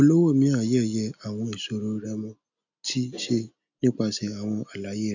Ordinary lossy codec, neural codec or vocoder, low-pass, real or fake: none; none; 7.2 kHz; real